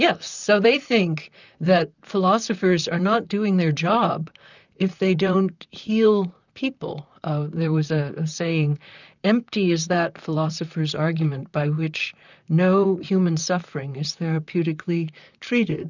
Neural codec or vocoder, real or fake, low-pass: vocoder, 44.1 kHz, 128 mel bands, Pupu-Vocoder; fake; 7.2 kHz